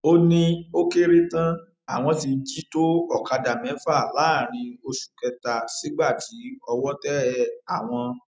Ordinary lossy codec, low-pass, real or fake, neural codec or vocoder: none; none; real; none